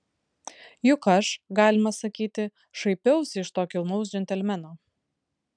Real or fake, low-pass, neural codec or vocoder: real; 9.9 kHz; none